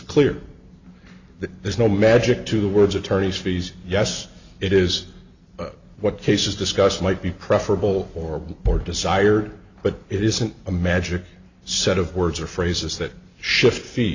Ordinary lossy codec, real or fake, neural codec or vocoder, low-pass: Opus, 64 kbps; real; none; 7.2 kHz